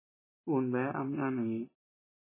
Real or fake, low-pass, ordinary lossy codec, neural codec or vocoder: real; 3.6 kHz; MP3, 16 kbps; none